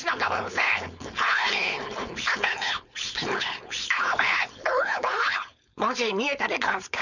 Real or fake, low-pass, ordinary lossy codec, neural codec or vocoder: fake; 7.2 kHz; none; codec, 16 kHz, 4.8 kbps, FACodec